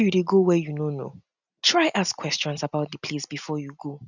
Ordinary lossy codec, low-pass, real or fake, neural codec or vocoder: none; 7.2 kHz; real; none